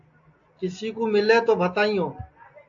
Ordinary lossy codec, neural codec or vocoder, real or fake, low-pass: AAC, 48 kbps; none; real; 7.2 kHz